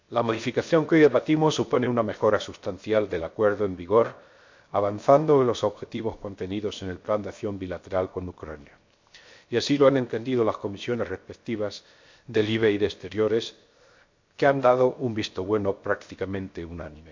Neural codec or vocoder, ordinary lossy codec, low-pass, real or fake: codec, 16 kHz, 0.7 kbps, FocalCodec; MP3, 48 kbps; 7.2 kHz; fake